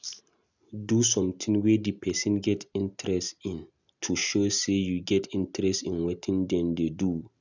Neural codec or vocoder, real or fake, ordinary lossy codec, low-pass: none; real; none; 7.2 kHz